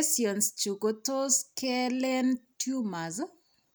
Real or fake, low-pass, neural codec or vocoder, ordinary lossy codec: real; none; none; none